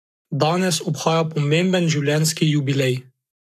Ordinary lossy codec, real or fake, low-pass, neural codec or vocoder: none; fake; 14.4 kHz; codec, 44.1 kHz, 7.8 kbps, Pupu-Codec